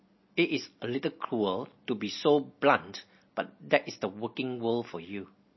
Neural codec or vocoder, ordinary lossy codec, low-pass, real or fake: none; MP3, 24 kbps; 7.2 kHz; real